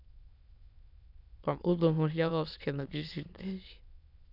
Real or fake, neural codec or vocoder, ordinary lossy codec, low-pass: fake; autoencoder, 22.05 kHz, a latent of 192 numbers a frame, VITS, trained on many speakers; none; 5.4 kHz